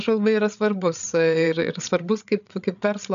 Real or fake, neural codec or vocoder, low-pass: fake; codec, 16 kHz, 8 kbps, FreqCodec, larger model; 7.2 kHz